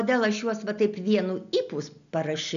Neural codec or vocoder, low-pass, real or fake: none; 7.2 kHz; real